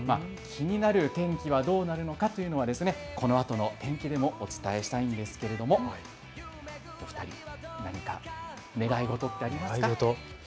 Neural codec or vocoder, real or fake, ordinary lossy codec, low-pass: none; real; none; none